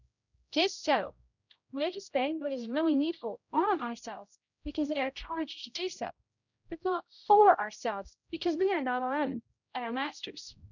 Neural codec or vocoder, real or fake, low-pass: codec, 16 kHz, 0.5 kbps, X-Codec, HuBERT features, trained on general audio; fake; 7.2 kHz